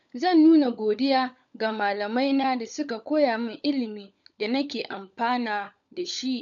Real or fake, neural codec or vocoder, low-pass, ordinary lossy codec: fake; codec, 16 kHz, 16 kbps, FunCodec, trained on LibriTTS, 50 frames a second; 7.2 kHz; none